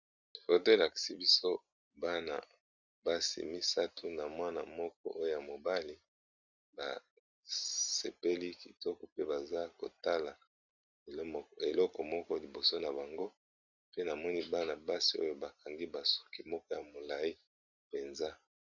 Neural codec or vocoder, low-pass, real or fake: none; 7.2 kHz; real